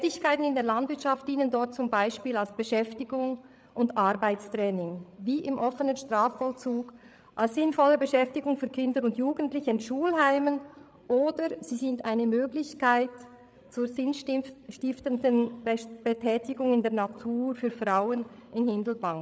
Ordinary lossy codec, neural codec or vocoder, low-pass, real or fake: none; codec, 16 kHz, 8 kbps, FreqCodec, larger model; none; fake